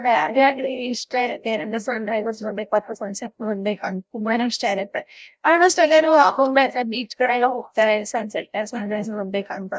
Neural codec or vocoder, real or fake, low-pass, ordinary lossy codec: codec, 16 kHz, 0.5 kbps, FreqCodec, larger model; fake; none; none